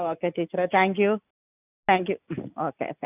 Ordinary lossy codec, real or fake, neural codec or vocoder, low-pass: none; real; none; 3.6 kHz